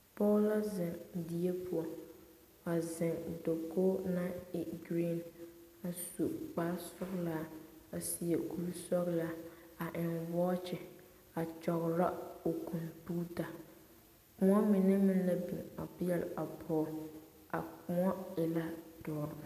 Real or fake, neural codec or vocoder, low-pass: real; none; 14.4 kHz